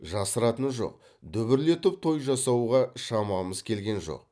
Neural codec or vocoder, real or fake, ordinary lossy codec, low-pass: none; real; none; none